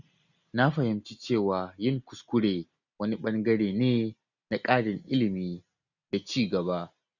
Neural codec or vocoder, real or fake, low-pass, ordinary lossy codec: none; real; 7.2 kHz; none